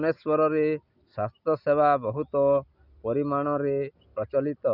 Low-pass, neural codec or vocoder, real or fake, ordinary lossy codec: 5.4 kHz; none; real; none